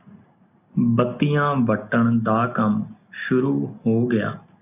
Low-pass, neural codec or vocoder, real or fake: 3.6 kHz; none; real